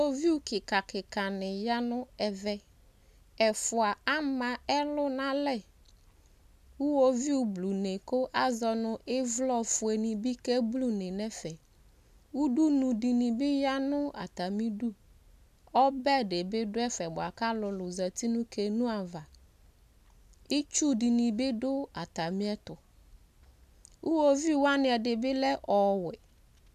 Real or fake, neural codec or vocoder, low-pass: real; none; 14.4 kHz